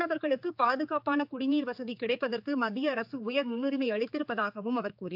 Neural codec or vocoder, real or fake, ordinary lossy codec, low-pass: codec, 16 kHz, 4 kbps, X-Codec, HuBERT features, trained on general audio; fake; AAC, 48 kbps; 5.4 kHz